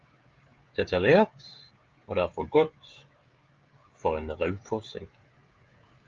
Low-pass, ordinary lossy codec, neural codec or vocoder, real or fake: 7.2 kHz; Opus, 32 kbps; codec, 16 kHz, 16 kbps, FreqCodec, smaller model; fake